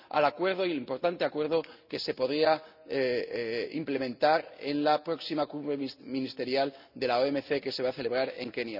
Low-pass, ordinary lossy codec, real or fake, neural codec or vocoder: 5.4 kHz; none; real; none